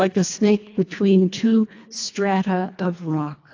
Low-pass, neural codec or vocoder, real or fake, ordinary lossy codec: 7.2 kHz; codec, 24 kHz, 1.5 kbps, HILCodec; fake; AAC, 48 kbps